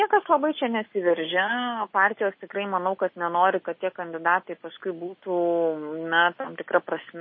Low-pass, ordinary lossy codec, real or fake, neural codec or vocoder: 7.2 kHz; MP3, 24 kbps; real; none